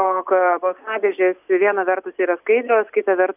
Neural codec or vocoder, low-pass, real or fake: none; 3.6 kHz; real